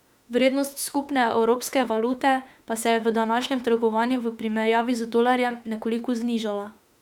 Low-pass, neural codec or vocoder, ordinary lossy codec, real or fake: 19.8 kHz; autoencoder, 48 kHz, 32 numbers a frame, DAC-VAE, trained on Japanese speech; none; fake